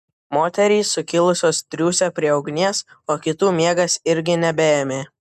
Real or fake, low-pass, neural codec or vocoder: real; 14.4 kHz; none